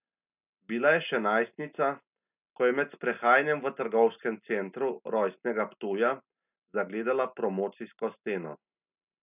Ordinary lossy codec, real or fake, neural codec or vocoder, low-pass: none; real; none; 3.6 kHz